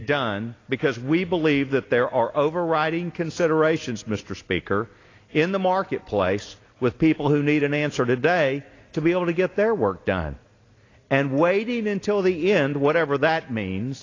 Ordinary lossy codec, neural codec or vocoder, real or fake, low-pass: AAC, 32 kbps; none; real; 7.2 kHz